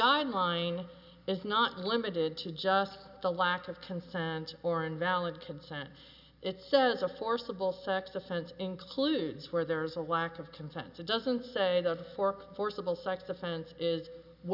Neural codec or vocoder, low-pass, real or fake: none; 5.4 kHz; real